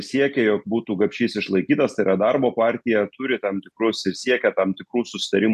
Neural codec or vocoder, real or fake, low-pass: none; real; 14.4 kHz